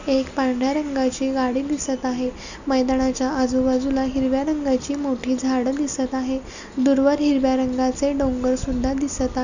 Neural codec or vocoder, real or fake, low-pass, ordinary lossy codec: none; real; 7.2 kHz; none